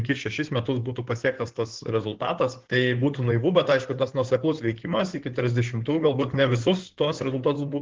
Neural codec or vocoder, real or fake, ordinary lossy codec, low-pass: codec, 16 kHz in and 24 kHz out, 2.2 kbps, FireRedTTS-2 codec; fake; Opus, 16 kbps; 7.2 kHz